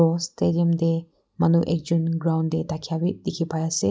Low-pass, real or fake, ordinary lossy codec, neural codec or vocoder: none; real; none; none